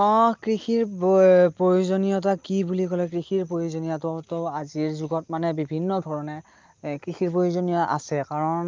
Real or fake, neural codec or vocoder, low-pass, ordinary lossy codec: real; none; 7.2 kHz; Opus, 24 kbps